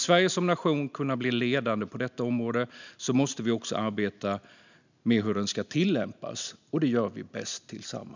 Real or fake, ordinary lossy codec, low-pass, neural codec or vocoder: real; none; 7.2 kHz; none